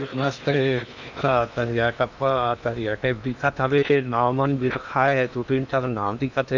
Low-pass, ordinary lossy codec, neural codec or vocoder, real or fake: 7.2 kHz; none; codec, 16 kHz in and 24 kHz out, 0.8 kbps, FocalCodec, streaming, 65536 codes; fake